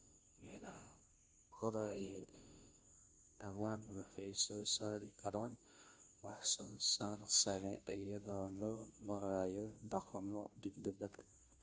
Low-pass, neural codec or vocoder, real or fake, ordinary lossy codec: none; codec, 16 kHz, 0.5 kbps, FunCodec, trained on Chinese and English, 25 frames a second; fake; none